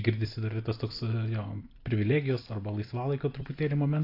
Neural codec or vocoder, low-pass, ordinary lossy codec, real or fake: vocoder, 44.1 kHz, 128 mel bands every 256 samples, BigVGAN v2; 5.4 kHz; AAC, 32 kbps; fake